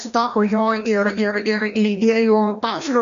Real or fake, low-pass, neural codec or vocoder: fake; 7.2 kHz; codec, 16 kHz, 1 kbps, FreqCodec, larger model